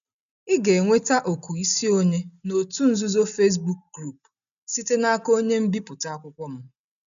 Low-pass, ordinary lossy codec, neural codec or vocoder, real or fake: 7.2 kHz; none; none; real